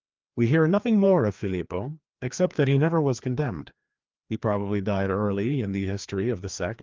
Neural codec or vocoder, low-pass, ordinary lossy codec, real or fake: codec, 16 kHz, 2 kbps, FreqCodec, larger model; 7.2 kHz; Opus, 32 kbps; fake